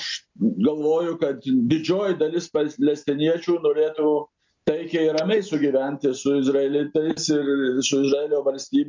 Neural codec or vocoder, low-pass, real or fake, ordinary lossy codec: none; 7.2 kHz; real; MP3, 64 kbps